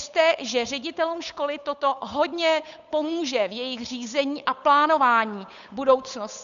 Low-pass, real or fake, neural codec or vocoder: 7.2 kHz; fake; codec, 16 kHz, 8 kbps, FunCodec, trained on Chinese and English, 25 frames a second